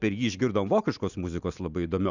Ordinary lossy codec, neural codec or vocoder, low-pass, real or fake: Opus, 64 kbps; none; 7.2 kHz; real